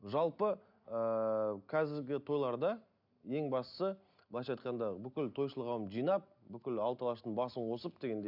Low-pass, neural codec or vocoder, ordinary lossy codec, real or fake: 5.4 kHz; none; none; real